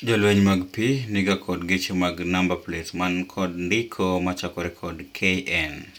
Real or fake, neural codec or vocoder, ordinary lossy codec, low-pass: real; none; none; 19.8 kHz